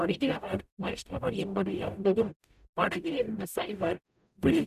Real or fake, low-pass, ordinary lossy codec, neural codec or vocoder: fake; 14.4 kHz; none; codec, 44.1 kHz, 0.9 kbps, DAC